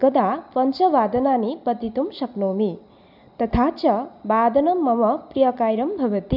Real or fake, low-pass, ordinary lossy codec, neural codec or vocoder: real; 5.4 kHz; none; none